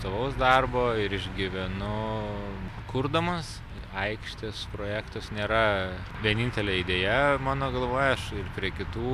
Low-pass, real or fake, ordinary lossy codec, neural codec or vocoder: 14.4 kHz; real; MP3, 96 kbps; none